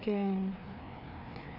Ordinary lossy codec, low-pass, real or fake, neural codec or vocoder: none; 5.4 kHz; fake; codec, 16 kHz, 2 kbps, FreqCodec, larger model